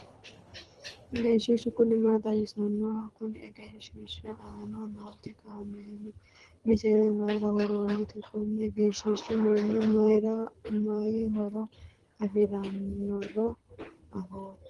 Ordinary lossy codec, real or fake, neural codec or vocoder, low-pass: Opus, 24 kbps; fake; codec, 24 kHz, 3 kbps, HILCodec; 10.8 kHz